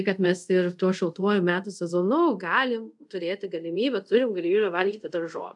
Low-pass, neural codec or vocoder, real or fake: 10.8 kHz; codec, 24 kHz, 0.5 kbps, DualCodec; fake